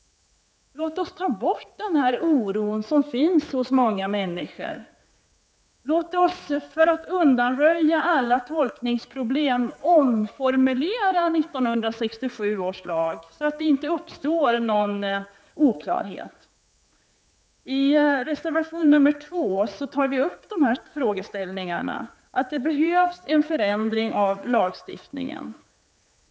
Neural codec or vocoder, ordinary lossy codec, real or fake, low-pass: codec, 16 kHz, 4 kbps, X-Codec, HuBERT features, trained on general audio; none; fake; none